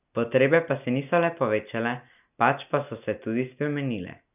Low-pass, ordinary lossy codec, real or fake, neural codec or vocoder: 3.6 kHz; none; real; none